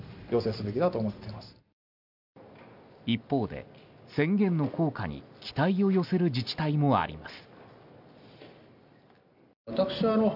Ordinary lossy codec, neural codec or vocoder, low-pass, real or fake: none; none; 5.4 kHz; real